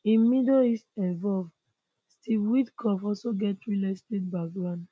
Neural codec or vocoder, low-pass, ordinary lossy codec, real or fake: none; none; none; real